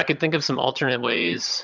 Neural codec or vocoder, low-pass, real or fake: vocoder, 22.05 kHz, 80 mel bands, HiFi-GAN; 7.2 kHz; fake